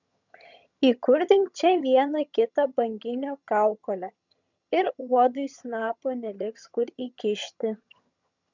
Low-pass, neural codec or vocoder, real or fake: 7.2 kHz; vocoder, 22.05 kHz, 80 mel bands, HiFi-GAN; fake